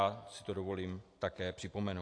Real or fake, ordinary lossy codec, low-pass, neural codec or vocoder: real; AAC, 48 kbps; 9.9 kHz; none